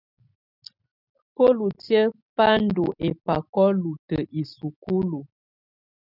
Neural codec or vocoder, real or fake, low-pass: none; real; 5.4 kHz